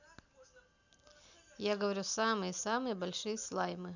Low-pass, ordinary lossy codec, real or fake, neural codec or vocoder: 7.2 kHz; none; real; none